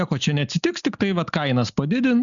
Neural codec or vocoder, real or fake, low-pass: none; real; 7.2 kHz